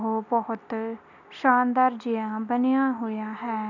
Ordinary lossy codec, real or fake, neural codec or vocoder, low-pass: none; real; none; 7.2 kHz